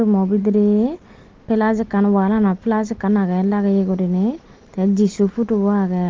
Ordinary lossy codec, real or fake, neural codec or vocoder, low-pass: Opus, 16 kbps; real; none; 7.2 kHz